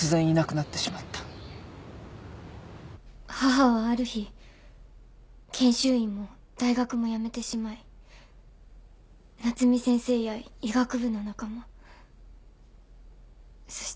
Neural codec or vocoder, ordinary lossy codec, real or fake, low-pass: none; none; real; none